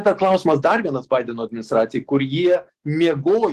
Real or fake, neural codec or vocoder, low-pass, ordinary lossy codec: fake; autoencoder, 48 kHz, 128 numbers a frame, DAC-VAE, trained on Japanese speech; 14.4 kHz; Opus, 16 kbps